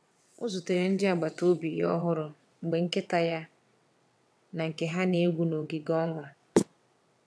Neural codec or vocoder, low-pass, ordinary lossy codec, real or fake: vocoder, 22.05 kHz, 80 mel bands, WaveNeXt; none; none; fake